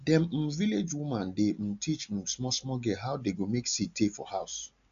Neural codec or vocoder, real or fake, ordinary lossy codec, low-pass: none; real; AAC, 96 kbps; 7.2 kHz